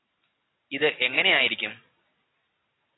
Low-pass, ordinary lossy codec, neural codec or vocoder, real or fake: 7.2 kHz; AAC, 16 kbps; none; real